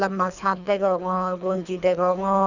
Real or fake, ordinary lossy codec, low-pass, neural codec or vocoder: fake; none; 7.2 kHz; codec, 24 kHz, 3 kbps, HILCodec